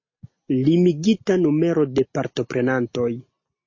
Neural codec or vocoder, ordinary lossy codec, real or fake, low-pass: none; MP3, 32 kbps; real; 7.2 kHz